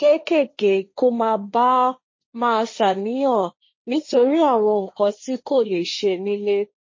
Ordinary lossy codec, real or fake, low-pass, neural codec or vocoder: MP3, 32 kbps; fake; 7.2 kHz; codec, 16 kHz, 1.1 kbps, Voila-Tokenizer